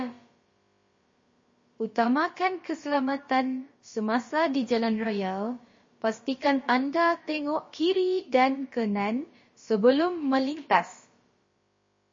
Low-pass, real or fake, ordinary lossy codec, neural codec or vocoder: 7.2 kHz; fake; MP3, 32 kbps; codec, 16 kHz, about 1 kbps, DyCAST, with the encoder's durations